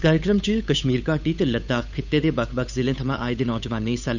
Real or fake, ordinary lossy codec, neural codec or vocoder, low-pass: fake; none; codec, 16 kHz, 8 kbps, FunCodec, trained on Chinese and English, 25 frames a second; 7.2 kHz